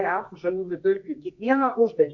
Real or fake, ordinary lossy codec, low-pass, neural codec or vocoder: fake; MP3, 48 kbps; 7.2 kHz; codec, 24 kHz, 0.9 kbps, WavTokenizer, medium music audio release